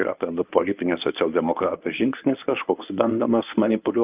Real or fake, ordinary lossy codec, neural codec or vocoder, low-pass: fake; Opus, 24 kbps; codec, 24 kHz, 0.9 kbps, WavTokenizer, medium speech release version 1; 3.6 kHz